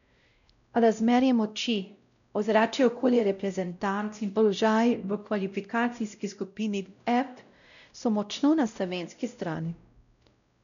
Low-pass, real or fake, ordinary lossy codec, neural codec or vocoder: 7.2 kHz; fake; none; codec, 16 kHz, 0.5 kbps, X-Codec, WavLM features, trained on Multilingual LibriSpeech